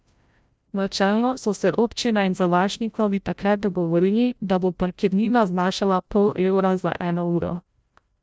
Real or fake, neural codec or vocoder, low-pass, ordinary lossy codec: fake; codec, 16 kHz, 0.5 kbps, FreqCodec, larger model; none; none